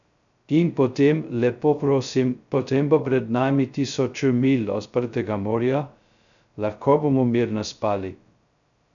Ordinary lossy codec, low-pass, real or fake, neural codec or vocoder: none; 7.2 kHz; fake; codec, 16 kHz, 0.2 kbps, FocalCodec